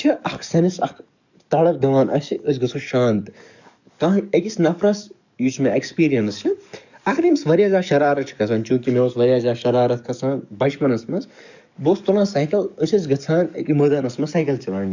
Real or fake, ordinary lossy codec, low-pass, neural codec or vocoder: fake; none; 7.2 kHz; codec, 44.1 kHz, 7.8 kbps, DAC